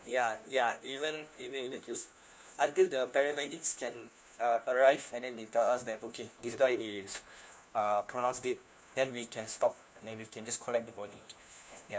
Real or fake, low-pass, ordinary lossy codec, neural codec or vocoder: fake; none; none; codec, 16 kHz, 1 kbps, FunCodec, trained on LibriTTS, 50 frames a second